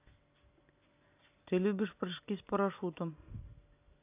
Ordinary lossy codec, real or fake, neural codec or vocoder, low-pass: AAC, 24 kbps; real; none; 3.6 kHz